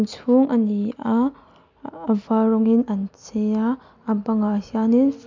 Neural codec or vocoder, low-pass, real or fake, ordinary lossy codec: none; 7.2 kHz; real; MP3, 64 kbps